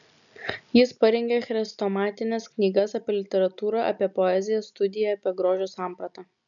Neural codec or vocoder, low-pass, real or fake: none; 7.2 kHz; real